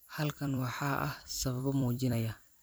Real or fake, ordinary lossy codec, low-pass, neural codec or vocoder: fake; none; none; vocoder, 44.1 kHz, 128 mel bands every 256 samples, BigVGAN v2